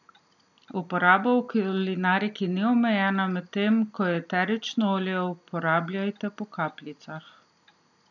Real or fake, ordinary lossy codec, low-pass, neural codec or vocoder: real; none; 7.2 kHz; none